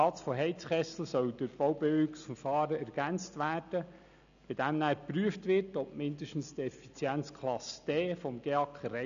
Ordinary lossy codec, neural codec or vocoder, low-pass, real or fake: none; none; 7.2 kHz; real